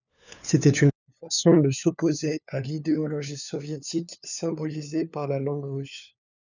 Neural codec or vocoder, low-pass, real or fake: codec, 16 kHz, 4 kbps, FunCodec, trained on LibriTTS, 50 frames a second; 7.2 kHz; fake